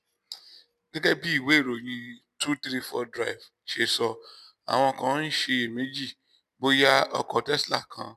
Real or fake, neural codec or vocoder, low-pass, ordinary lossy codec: real; none; 14.4 kHz; none